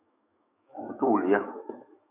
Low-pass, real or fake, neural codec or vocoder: 3.6 kHz; fake; autoencoder, 48 kHz, 128 numbers a frame, DAC-VAE, trained on Japanese speech